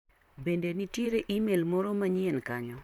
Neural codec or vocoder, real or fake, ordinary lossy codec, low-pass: vocoder, 44.1 kHz, 128 mel bands, Pupu-Vocoder; fake; none; 19.8 kHz